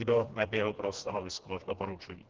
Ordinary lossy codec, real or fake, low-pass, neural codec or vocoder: Opus, 16 kbps; fake; 7.2 kHz; codec, 16 kHz, 2 kbps, FreqCodec, smaller model